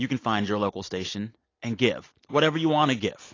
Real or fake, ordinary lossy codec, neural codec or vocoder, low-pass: real; AAC, 32 kbps; none; 7.2 kHz